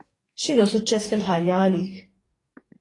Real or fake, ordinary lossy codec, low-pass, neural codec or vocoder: fake; AAC, 32 kbps; 10.8 kHz; codec, 44.1 kHz, 2.6 kbps, DAC